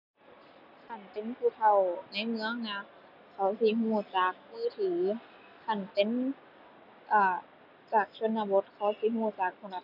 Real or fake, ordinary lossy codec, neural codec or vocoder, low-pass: real; none; none; 5.4 kHz